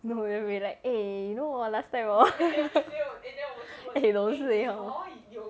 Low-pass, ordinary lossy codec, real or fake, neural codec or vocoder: none; none; real; none